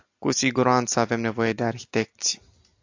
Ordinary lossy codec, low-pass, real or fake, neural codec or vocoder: AAC, 48 kbps; 7.2 kHz; real; none